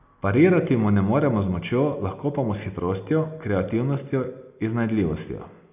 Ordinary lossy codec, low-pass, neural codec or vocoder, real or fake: none; 3.6 kHz; none; real